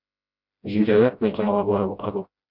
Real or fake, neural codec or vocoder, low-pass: fake; codec, 16 kHz, 0.5 kbps, FreqCodec, smaller model; 5.4 kHz